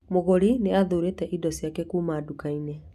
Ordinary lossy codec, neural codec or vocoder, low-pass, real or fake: none; none; 14.4 kHz; real